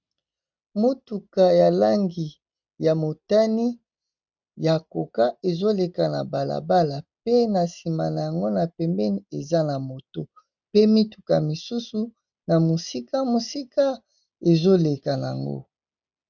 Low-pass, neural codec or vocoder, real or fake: 7.2 kHz; none; real